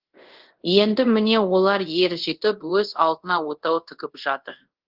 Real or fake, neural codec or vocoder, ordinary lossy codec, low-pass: fake; codec, 24 kHz, 0.5 kbps, DualCodec; Opus, 16 kbps; 5.4 kHz